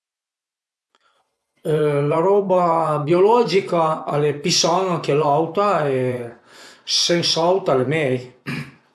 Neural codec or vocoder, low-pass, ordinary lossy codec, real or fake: vocoder, 24 kHz, 100 mel bands, Vocos; none; none; fake